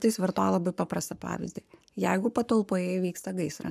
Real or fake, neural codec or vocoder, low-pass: fake; codec, 44.1 kHz, 7.8 kbps, Pupu-Codec; 14.4 kHz